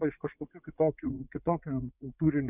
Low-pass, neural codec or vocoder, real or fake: 3.6 kHz; vocoder, 44.1 kHz, 80 mel bands, Vocos; fake